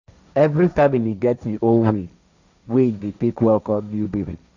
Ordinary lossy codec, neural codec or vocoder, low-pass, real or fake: none; codec, 16 kHz, 1.1 kbps, Voila-Tokenizer; 7.2 kHz; fake